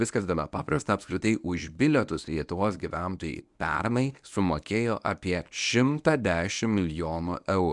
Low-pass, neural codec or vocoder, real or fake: 10.8 kHz; codec, 24 kHz, 0.9 kbps, WavTokenizer, medium speech release version 1; fake